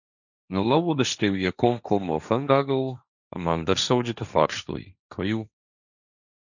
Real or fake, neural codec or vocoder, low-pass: fake; codec, 16 kHz, 1.1 kbps, Voila-Tokenizer; 7.2 kHz